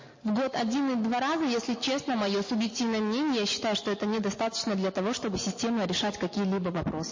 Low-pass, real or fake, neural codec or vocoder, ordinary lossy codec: 7.2 kHz; real; none; MP3, 32 kbps